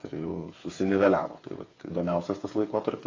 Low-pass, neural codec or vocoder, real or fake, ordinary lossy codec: 7.2 kHz; codec, 16 kHz, 8 kbps, FreqCodec, smaller model; fake; AAC, 32 kbps